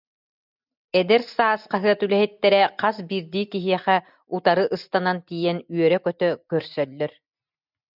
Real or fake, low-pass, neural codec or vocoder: real; 5.4 kHz; none